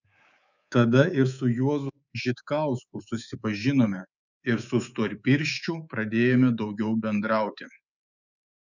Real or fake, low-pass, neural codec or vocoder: fake; 7.2 kHz; codec, 24 kHz, 3.1 kbps, DualCodec